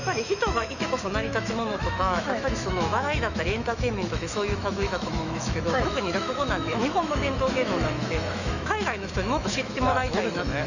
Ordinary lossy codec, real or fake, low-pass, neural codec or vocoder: none; fake; 7.2 kHz; autoencoder, 48 kHz, 128 numbers a frame, DAC-VAE, trained on Japanese speech